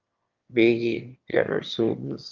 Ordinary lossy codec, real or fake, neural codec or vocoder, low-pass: Opus, 24 kbps; fake; autoencoder, 22.05 kHz, a latent of 192 numbers a frame, VITS, trained on one speaker; 7.2 kHz